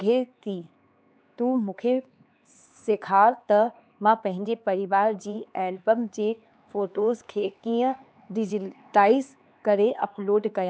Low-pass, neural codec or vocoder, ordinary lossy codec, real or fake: none; codec, 16 kHz, 4 kbps, X-Codec, HuBERT features, trained on LibriSpeech; none; fake